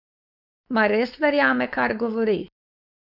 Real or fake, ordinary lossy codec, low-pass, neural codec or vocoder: fake; none; 5.4 kHz; codec, 16 kHz, 4.8 kbps, FACodec